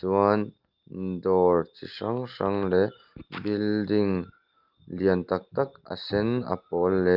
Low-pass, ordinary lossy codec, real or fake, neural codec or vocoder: 5.4 kHz; Opus, 32 kbps; real; none